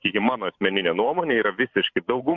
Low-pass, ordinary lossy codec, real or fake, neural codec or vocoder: 7.2 kHz; MP3, 48 kbps; fake; vocoder, 44.1 kHz, 128 mel bands every 512 samples, BigVGAN v2